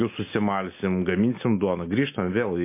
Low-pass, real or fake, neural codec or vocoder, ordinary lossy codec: 3.6 kHz; real; none; MP3, 24 kbps